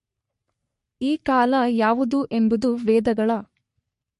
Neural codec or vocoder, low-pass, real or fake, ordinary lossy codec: codec, 44.1 kHz, 3.4 kbps, Pupu-Codec; 14.4 kHz; fake; MP3, 48 kbps